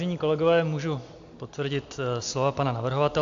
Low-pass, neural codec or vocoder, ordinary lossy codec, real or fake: 7.2 kHz; none; AAC, 64 kbps; real